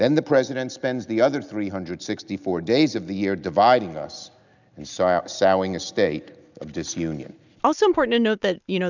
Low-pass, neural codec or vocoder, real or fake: 7.2 kHz; none; real